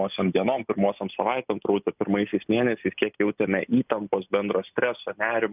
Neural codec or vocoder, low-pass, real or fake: none; 3.6 kHz; real